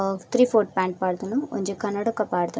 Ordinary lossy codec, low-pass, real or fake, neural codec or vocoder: none; none; real; none